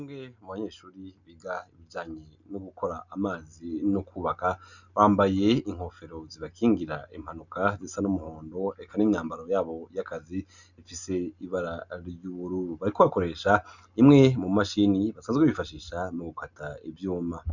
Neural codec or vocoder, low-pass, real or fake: none; 7.2 kHz; real